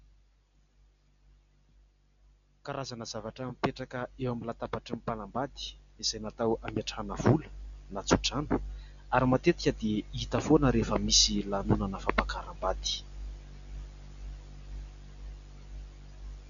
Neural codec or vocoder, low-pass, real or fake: none; 7.2 kHz; real